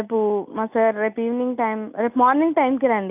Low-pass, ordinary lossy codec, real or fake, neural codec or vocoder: 3.6 kHz; none; real; none